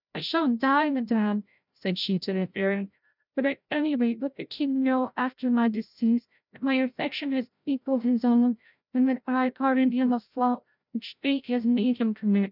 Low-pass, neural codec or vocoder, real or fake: 5.4 kHz; codec, 16 kHz, 0.5 kbps, FreqCodec, larger model; fake